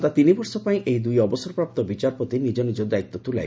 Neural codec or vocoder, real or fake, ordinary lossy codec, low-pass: none; real; none; none